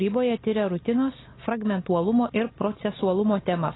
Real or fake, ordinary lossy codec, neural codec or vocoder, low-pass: real; AAC, 16 kbps; none; 7.2 kHz